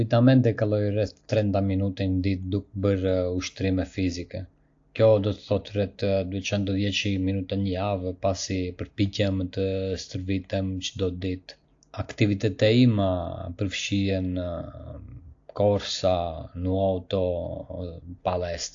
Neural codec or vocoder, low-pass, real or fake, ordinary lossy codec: none; 7.2 kHz; real; AAC, 48 kbps